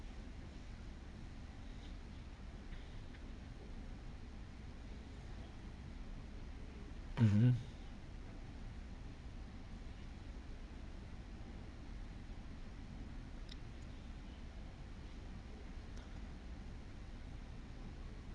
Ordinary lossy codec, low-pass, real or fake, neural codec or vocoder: none; none; fake; vocoder, 22.05 kHz, 80 mel bands, WaveNeXt